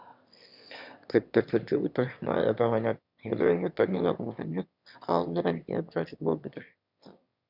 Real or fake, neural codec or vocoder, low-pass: fake; autoencoder, 22.05 kHz, a latent of 192 numbers a frame, VITS, trained on one speaker; 5.4 kHz